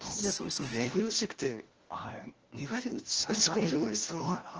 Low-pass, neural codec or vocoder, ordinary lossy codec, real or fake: 7.2 kHz; codec, 16 kHz, 1 kbps, FunCodec, trained on LibriTTS, 50 frames a second; Opus, 16 kbps; fake